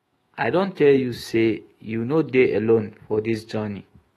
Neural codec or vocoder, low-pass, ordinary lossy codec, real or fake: autoencoder, 48 kHz, 128 numbers a frame, DAC-VAE, trained on Japanese speech; 19.8 kHz; AAC, 32 kbps; fake